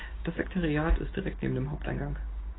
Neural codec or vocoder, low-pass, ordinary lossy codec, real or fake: none; 7.2 kHz; AAC, 16 kbps; real